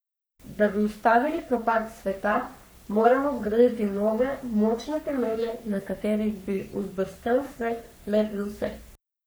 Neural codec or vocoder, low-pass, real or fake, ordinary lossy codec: codec, 44.1 kHz, 3.4 kbps, Pupu-Codec; none; fake; none